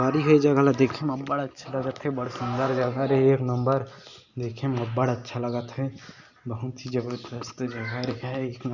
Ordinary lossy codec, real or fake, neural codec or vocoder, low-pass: Opus, 64 kbps; real; none; 7.2 kHz